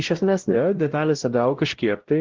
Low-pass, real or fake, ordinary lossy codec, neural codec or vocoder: 7.2 kHz; fake; Opus, 16 kbps; codec, 16 kHz, 0.5 kbps, X-Codec, WavLM features, trained on Multilingual LibriSpeech